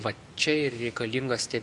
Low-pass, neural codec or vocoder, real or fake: 10.8 kHz; none; real